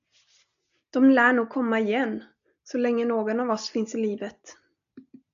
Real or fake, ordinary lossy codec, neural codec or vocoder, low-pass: real; MP3, 96 kbps; none; 7.2 kHz